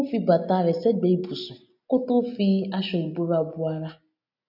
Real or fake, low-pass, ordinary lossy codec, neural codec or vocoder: real; 5.4 kHz; none; none